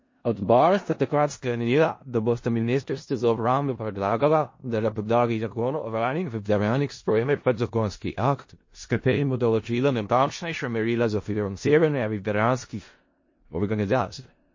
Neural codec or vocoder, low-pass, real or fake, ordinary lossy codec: codec, 16 kHz in and 24 kHz out, 0.4 kbps, LongCat-Audio-Codec, four codebook decoder; 7.2 kHz; fake; MP3, 32 kbps